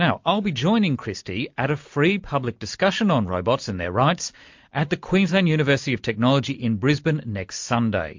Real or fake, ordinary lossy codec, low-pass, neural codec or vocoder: real; MP3, 48 kbps; 7.2 kHz; none